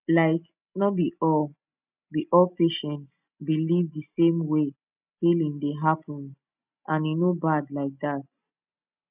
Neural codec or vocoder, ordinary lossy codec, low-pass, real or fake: none; none; 3.6 kHz; real